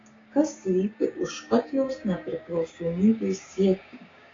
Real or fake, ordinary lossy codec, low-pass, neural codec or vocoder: fake; AAC, 32 kbps; 7.2 kHz; codec, 16 kHz, 6 kbps, DAC